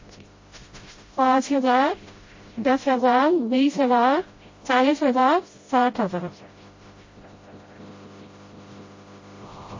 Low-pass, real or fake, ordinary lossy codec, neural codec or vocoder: 7.2 kHz; fake; MP3, 32 kbps; codec, 16 kHz, 0.5 kbps, FreqCodec, smaller model